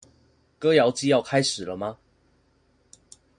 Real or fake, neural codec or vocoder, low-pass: real; none; 9.9 kHz